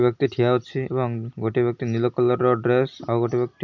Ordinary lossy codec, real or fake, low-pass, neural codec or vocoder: MP3, 64 kbps; real; 7.2 kHz; none